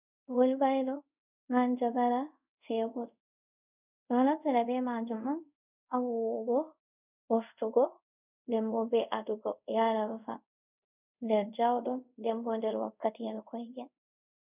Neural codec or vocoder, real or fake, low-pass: codec, 24 kHz, 0.5 kbps, DualCodec; fake; 3.6 kHz